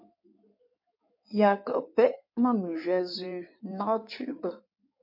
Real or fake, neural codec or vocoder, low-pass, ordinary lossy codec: fake; codec, 16 kHz in and 24 kHz out, 2.2 kbps, FireRedTTS-2 codec; 5.4 kHz; MP3, 32 kbps